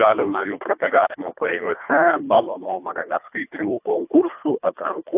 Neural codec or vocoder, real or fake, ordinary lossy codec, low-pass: codec, 24 kHz, 1.5 kbps, HILCodec; fake; AAC, 32 kbps; 3.6 kHz